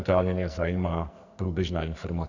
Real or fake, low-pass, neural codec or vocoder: fake; 7.2 kHz; codec, 44.1 kHz, 2.6 kbps, SNAC